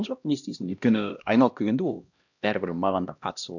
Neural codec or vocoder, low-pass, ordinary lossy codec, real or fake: codec, 16 kHz, 1 kbps, X-Codec, HuBERT features, trained on LibriSpeech; 7.2 kHz; none; fake